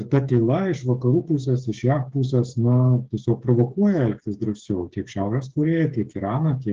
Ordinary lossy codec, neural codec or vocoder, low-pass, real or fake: Opus, 16 kbps; codec, 16 kHz, 8 kbps, FreqCodec, smaller model; 7.2 kHz; fake